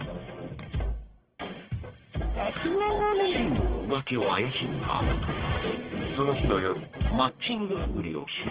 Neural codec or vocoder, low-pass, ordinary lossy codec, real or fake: codec, 44.1 kHz, 1.7 kbps, Pupu-Codec; 3.6 kHz; Opus, 16 kbps; fake